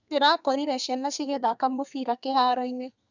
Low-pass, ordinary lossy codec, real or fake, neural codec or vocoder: 7.2 kHz; none; fake; codec, 32 kHz, 1.9 kbps, SNAC